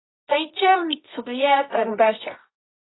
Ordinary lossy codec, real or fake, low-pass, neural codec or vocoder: AAC, 16 kbps; fake; 7.2 kHz; codec, 24 kHz, 0.9 kbps, WavTokenizer, medium music audio release